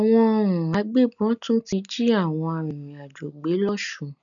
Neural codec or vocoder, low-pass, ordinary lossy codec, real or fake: none; 7.2 kHz; none; real